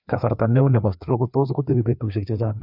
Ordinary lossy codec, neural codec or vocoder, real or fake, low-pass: none; codec, 16 kHz, 2 kbps, FreqCodec, larger model; fake; 5.4 kHz